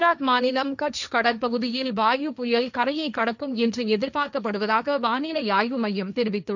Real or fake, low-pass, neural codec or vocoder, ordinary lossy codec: fake; 7.2 kHz; codec, 16 kHz, 1.1 kbps, Voila-Tokenizer; none